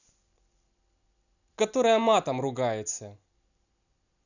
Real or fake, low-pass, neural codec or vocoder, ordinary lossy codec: real; 7.2 kHz; none; none